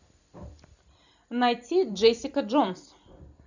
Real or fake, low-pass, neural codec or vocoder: fake; 7.2 kHz; vocoder, 44.1 kHz, 128 mel bands every 256 samples, BigVGAN v2